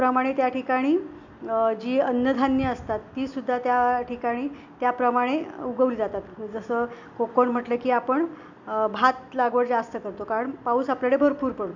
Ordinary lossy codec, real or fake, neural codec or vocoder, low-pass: none; real; none; 7.2 kHz